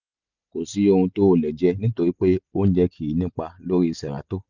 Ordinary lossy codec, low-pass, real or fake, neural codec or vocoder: none; 7.2 kHz; real; none